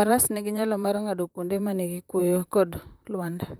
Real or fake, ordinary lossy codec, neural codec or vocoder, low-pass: fake; none; vocoder, 44.1 kHz, 128 mel bands, Pupu-Vocoder; none